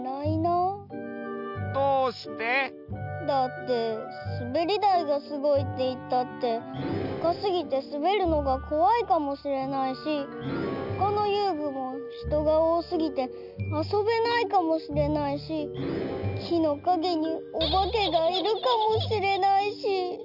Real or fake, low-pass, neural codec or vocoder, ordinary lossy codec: real; 5.4 kHz; none; none